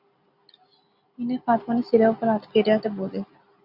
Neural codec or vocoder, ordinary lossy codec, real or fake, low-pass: none; Opus, 64 kbps; real; 5.4 kHz